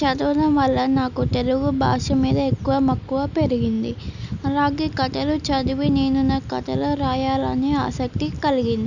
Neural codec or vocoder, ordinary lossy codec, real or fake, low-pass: none; none; real; 7.2 kHz